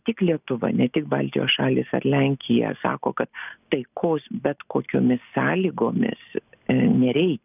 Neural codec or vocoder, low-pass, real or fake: none; 3.6 kHz; real